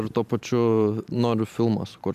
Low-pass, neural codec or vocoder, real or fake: 14.4 kHz; vocoder, 44.1 kHz, 128 mel bands every 512 samples, BigVGAN v2; fake